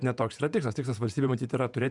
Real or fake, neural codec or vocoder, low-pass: fake; vocoder, 48 kHz, 128 mel bands, Vocos; 10.8 kHz